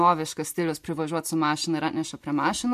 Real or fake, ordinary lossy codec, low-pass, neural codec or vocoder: real; MP3, 64 kbps; 14.4 kHz; none